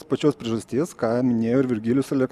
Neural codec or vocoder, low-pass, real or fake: none; 14.4 kHz; real